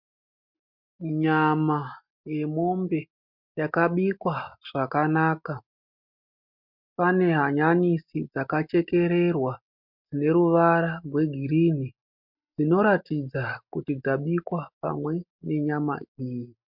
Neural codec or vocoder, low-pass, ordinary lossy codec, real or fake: none; 5.4 kHz; MP3, 48 kbps; real